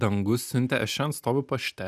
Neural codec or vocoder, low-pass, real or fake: none; 14.4 kHz; real